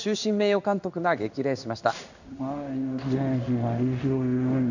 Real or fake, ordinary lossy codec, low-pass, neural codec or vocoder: fake; none; 7.2 kHz; codec, 16 kHz in and 24 kHz out, 1 kbps, XY-Tokenizer